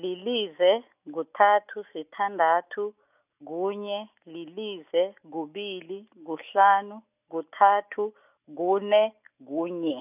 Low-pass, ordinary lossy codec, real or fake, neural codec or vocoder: 3.6 kHz; none; real; none